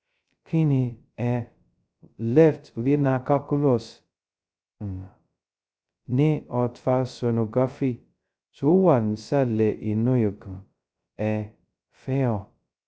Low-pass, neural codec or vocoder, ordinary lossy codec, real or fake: none; codec, 16 kHz, 0.2 kbps, FocalCodec; none; fake